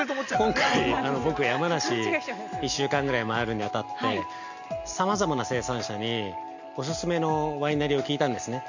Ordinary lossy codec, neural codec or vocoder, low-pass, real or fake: none; none; 7.2 kHz; real